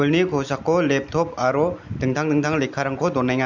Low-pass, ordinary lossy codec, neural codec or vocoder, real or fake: 7.2 kHz; MP3, 64 kbps; none; real